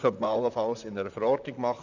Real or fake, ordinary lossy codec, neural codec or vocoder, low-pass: fake; none; vocoder, 44.1 kHz, 128 mel bands, Pupu-Vocoder; 7.2 kHz